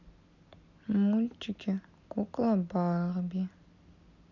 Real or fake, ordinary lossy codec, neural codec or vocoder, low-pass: real; none; none; 7.2 kHz